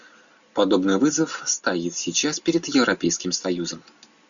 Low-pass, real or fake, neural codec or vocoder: 7.2 kHz; real; none